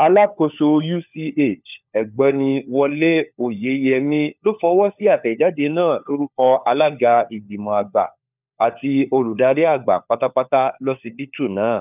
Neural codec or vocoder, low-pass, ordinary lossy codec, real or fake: codec, 16 kHz, 4 kbps, FunCodec, trained on LibriTTS, 50 frames a second; 3.6 kHz; none; fake